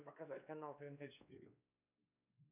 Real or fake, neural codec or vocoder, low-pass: fake; codec, 16 kHz, 2 kbps, X-Codec, WavLM features, trained on Multilingual LibriSpeech; 3.6 kHz